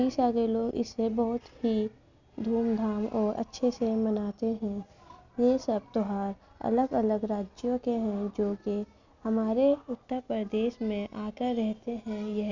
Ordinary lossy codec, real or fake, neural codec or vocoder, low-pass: none; real; none; 7.2 kHz